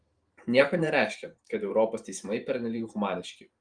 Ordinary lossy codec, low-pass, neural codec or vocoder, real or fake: Opus, 32 kbps; 9.9 kHz; vocoder, 44.1 kHz, 128 mel bands every 512 samples, BigVGAN v2; fake